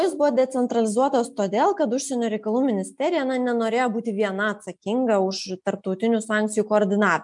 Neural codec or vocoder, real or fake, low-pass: none; real; 10.8 kHz